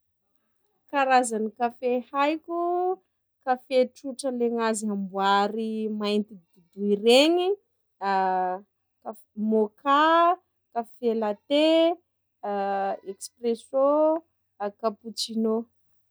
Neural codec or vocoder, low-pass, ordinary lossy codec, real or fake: none; none; none; real